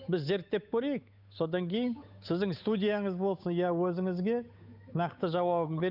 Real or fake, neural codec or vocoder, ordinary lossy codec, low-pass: fake; codec, 16 kHz, 8 kbps, FunCodec, trained on Chinese and English, 25 frames a second; none; 5.4 kHz